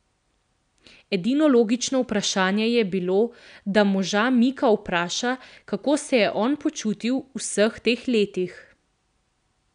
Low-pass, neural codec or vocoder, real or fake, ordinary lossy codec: 9.9 kHz; none; real; none